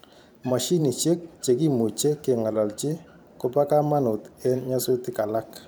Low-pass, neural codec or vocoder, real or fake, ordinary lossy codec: none; vocoder, 44.1 kHz, 128 mel bands every 512 samples, BigVGAN v2; fake; none